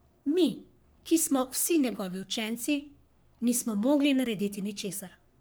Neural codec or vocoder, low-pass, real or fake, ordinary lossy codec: codec, 44.1 kHz, 3.4 kbps, Pupu-Codec; none; fake; none